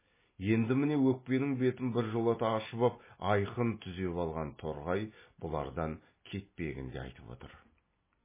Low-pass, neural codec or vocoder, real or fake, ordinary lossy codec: 3.6 kHz; none; real; MP3, 16 kbps